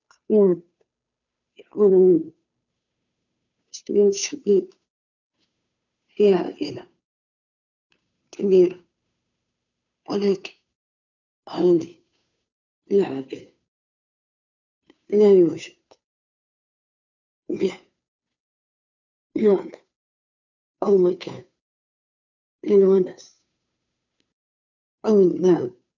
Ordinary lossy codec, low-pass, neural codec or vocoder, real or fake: none; 7.2 kHz; codec, 16 kHz, 2 kbps, FunCodec, trained on Chinese and English, 25 frames a second; fake